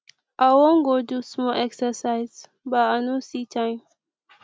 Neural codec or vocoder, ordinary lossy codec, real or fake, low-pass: none; none; real; none